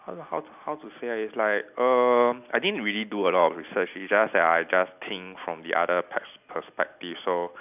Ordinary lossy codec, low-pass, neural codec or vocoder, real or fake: none; 3.6 kHz; none; real